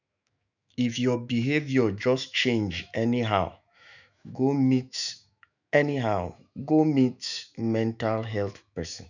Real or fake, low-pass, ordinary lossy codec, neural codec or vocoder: fake; 7.2 kHz; none; autoencoder, 48 kHz, 128 numbers a frame, DAC-VAE, trained on Japanese speech